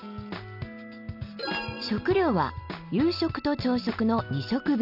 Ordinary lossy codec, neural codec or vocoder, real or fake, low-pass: none; none; real; 5.4 kHz